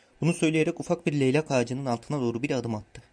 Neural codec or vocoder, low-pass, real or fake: none; 9.9 kHz; real